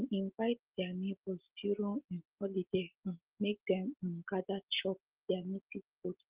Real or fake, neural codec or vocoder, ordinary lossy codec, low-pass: real; none; Opus, 16 kbps; 3.6 kHz